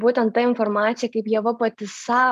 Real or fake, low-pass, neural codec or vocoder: real; 14.4 kHz; none